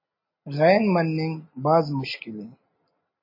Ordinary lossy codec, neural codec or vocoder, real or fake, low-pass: MP3, 24 kbps; none; real; 5.4 kHz